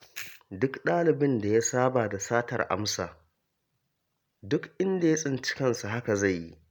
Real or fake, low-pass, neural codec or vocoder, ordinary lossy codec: real; none; none; none